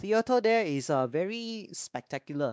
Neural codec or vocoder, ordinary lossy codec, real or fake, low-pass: codec, 16 kHz, 2 kbps, X-Codec, WavLM features, trained on Multilingual LibriSpeech; none; fake; none